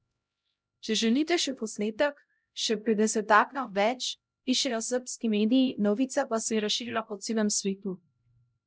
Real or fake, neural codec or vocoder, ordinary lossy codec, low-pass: fake; codec, 16 kHz, 0.5 kbps, X-Codec, HuBERT features, trained on LibriSpeech; none; none